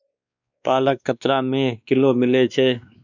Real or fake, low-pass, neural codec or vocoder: fake; 7.2 kHz; codec, 16 kHz, 2 kbps, X-Codec, WavLM features, trained on Multilingual LibriSpeech